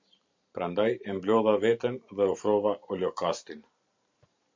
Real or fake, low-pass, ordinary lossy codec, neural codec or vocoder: fake; 7.2 kHz; MP3, 64 kbps; vocoder, 44.1 kHz, 128 mel bands every 512 samples, BigVGAN v2